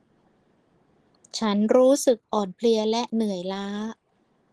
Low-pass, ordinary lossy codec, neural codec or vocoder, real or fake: 10.8 kHz; Opus, 16 kbps; none; real